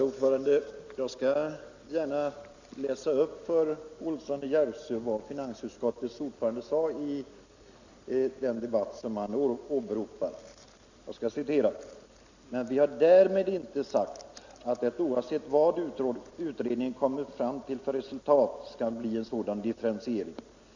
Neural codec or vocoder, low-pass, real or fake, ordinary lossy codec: none; 7.2 kHz; real; Opus, 64 kbps